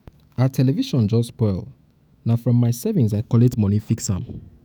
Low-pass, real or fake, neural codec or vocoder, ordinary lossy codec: none; fake; autoencoder, 48 kHz, 128 numbers a frame, DAC-VAE, trained on Japanese speech; none